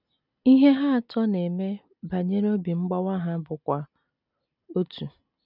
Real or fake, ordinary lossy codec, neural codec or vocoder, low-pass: real; none; none; 5.4 kHz